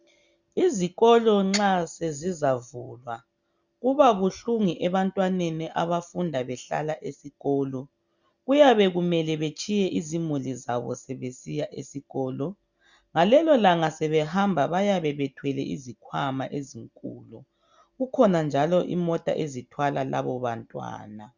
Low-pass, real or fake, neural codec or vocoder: 7.2 kHz; real; none